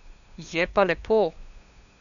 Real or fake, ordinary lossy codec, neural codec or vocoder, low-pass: fake; none; codec, 16 kHz, 2 kbps, FunCodec, trained on LibriTTS, 25 frames a second; 7.2 kHz